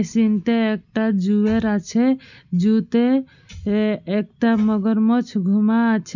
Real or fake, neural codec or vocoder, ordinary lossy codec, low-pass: real; none; none; 7.2 kHz